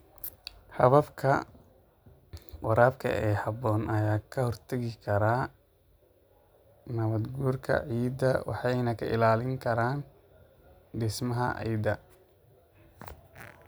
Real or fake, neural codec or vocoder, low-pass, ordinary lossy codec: real; none; none; none